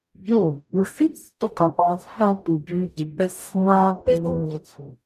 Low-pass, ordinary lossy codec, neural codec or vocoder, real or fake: 14.4 kHz; none; codec, 44.1 kHz, 0.9 kbps, DAC; fake